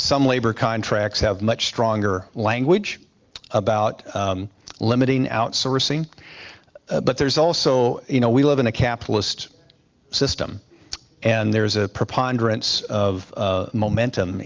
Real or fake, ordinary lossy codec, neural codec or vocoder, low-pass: real; Opus, 32 kbps; none; 7.2 kHz